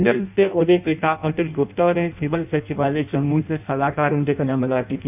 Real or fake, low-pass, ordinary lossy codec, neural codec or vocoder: fake; 3.6 kHz; none; codec, 16 kHz in and 24 kHz out, 0.6 kbps, FireRedTTS-2 codec